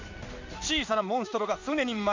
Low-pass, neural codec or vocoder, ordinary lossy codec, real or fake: 7.2 kHz; codec, 16 kHz in and 24 kHz out, 1 kbps, XY-Tokenizer; MP3, 64 kbps; fake